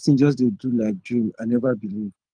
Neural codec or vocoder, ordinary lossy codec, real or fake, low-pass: codec, 24 kHz, 6 kbps, HILCodec; Opus, 32 kbps; fake; 9.9 kHz